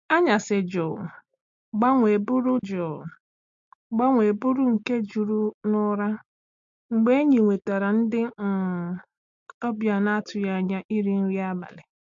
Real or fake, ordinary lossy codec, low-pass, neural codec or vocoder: real; MP3, 48 kbps; 7.2 kHz; none